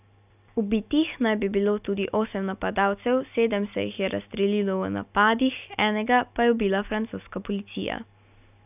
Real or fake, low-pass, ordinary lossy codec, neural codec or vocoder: real; 3.6 kHz; none; none